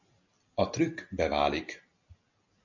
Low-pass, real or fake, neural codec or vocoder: 7.2 kHz; real; none